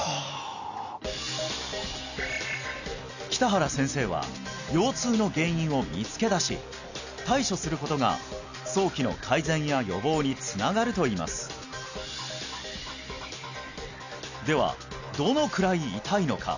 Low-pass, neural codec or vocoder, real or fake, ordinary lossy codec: 7.2 kHz; none; real; none